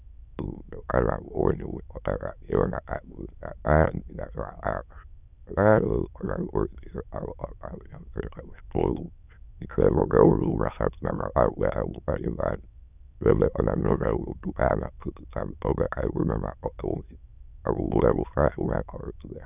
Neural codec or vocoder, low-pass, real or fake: autoencoder, 22.05 kHz, a latent of 192 numbers a frame, VITS, trained on many speakers; 3.6 kHz; fake